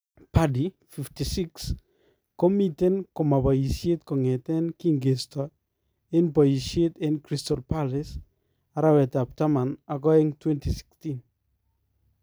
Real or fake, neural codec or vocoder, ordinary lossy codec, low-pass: real; none; none; none